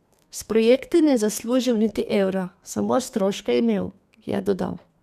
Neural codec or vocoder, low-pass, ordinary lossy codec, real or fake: codec, 32 kHz, 1.9 kbps, SNAC; 14.4 kHz; none; fake